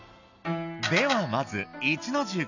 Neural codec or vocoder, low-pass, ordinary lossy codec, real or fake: none; 7.2 kHz; none; real